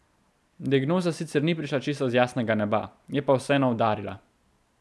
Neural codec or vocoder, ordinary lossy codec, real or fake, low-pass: none; none; real; none